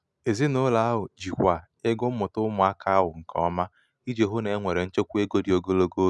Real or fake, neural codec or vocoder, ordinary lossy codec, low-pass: real; none; none; none